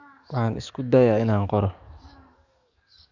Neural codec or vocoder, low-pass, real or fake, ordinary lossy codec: codec, 44.1 kHz, 7.8 kbps, DAC; 7.2 kHz; fake; none